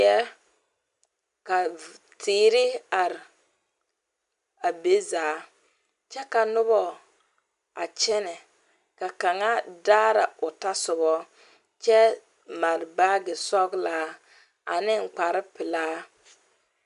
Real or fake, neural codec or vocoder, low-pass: real; none; 10.8 kHz